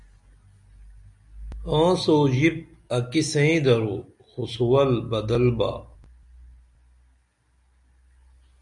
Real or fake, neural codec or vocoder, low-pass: real; none; 10.8 kHz